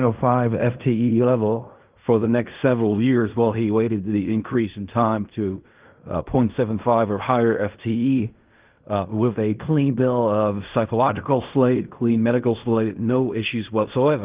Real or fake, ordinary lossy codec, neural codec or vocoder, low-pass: fake; Opus, 32 kbps; codec, 16 kHz in and 24 kHz out, 0.4 kbps, LongCat-Audio-Codec, fine tuned four codebook decoder; 3.6 kHz